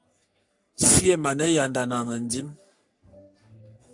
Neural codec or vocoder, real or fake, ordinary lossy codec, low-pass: codec, 44.1 kHz, 3.4 kbps, Pupu-Codec; fake; AAC, 64 kbps; 10.8 kHz